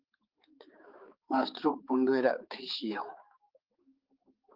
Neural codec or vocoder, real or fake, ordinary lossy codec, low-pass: codec, 16 kHz, 4 kbps, X-Codec, HuBERT features, trained on balanced general audio; fake; Opus, 32 kbps; 5.4 kHz